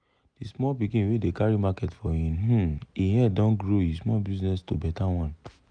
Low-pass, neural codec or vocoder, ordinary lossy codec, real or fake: 9.9 kHz; none; none; real